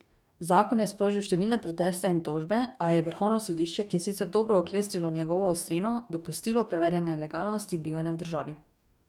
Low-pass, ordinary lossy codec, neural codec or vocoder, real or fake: 19.8 kHz; none; codec, 44.1 kHz, 2.6 kbps, DAC; fake